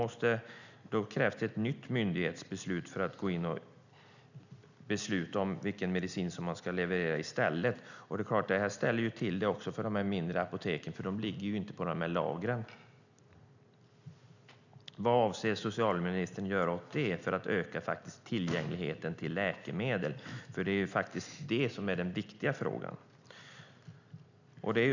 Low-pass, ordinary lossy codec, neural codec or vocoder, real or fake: 7.2 kHz; none; none; real